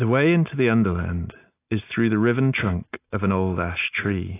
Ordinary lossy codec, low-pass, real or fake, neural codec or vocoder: AAC, 24 kbps; 3.6 kHz; real; none